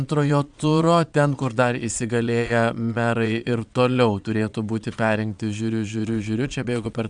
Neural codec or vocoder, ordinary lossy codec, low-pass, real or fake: vocoder, 22.05 kHz, 80 mel bands, Vocos; MP3, 96 kbps; 9.9 kHz; fake